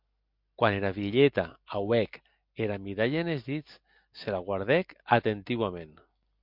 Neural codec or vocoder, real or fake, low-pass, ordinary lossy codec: none; real; 5.4 kHz; MP3, 48 kbps